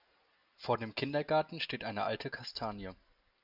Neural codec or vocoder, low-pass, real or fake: none; 5.4 kHz; real